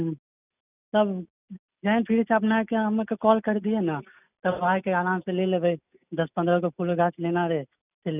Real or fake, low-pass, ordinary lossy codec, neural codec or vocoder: real; 3.6 kHz; none; none